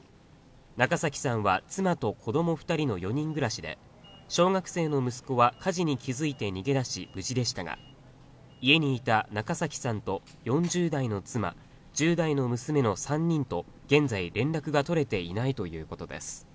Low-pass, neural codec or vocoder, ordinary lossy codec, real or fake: none; none; none; real